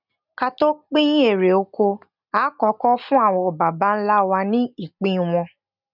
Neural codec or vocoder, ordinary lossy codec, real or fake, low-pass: none; none; real; 5.4 kHz